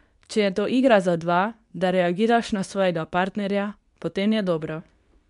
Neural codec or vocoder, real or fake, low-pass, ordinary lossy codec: codec, 24 kHz, 0.9 kbps, WavTokenizer, medium speech release version 2; fake; 10.8 kHz; none